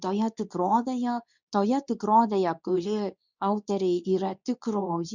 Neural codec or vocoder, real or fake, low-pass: codec, 24 kHz, 0.9 kbps, WavTokenizer, medium speech release version 2; fake; 7.2 kHz